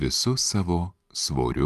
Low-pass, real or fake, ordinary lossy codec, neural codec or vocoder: 14.4 kHz; fake; Opus, 32 kbps; vocoder, 44.1 kHz, 128 mel bands every 512 samples, BigVGAN v2